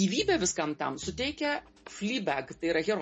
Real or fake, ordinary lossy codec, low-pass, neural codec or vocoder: real; MP3, 32 kbps; 10.8 kHz; none